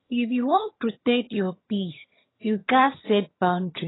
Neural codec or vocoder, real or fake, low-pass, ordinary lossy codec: vocoder, 22.05 kHz, 80 mel bands, HiFi-GAN; fake; 7.2 kHz; AAC, 16 kbps